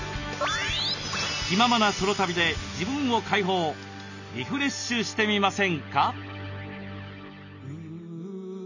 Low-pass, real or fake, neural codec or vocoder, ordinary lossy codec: 7.2 kHz; real; none; none